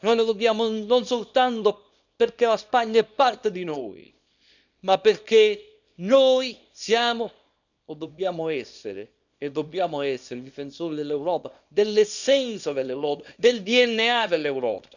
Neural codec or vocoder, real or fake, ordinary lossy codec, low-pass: codec, 24 kHz, 0.9 kbps, WavTokenizer, small release; fake; none; 7.2 kHz